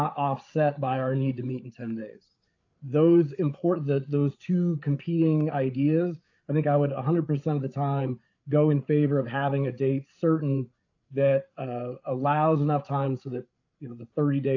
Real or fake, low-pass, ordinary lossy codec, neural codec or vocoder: fake; 7.2 kHz; AAC, 48 kbps; codec, 16 kHz, 8 kbps, FreqCodec, larger model